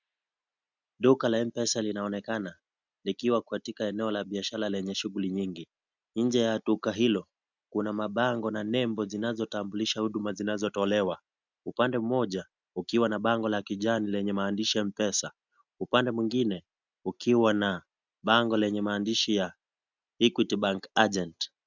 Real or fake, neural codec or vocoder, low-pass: real; none; 7.2 kHz